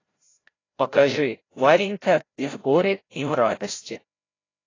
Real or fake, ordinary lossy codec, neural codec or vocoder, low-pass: fake; AAC, 32 kbps; codec, 16 kHz, 0.5 kbps, FreqCodec, larger model; 7.2 kHz